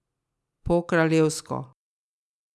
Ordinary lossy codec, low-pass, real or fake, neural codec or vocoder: none; none; real; none